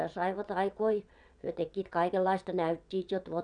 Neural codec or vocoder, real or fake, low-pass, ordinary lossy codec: vocoder, 22.05 kHz, 80 mel bands, WaveNeXt; fake; 9.9 kHz; none